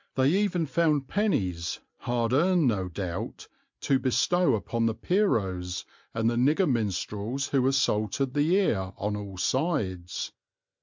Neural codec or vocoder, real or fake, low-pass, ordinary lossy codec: none; real; 7.2 kHz; MP3, 64 kbps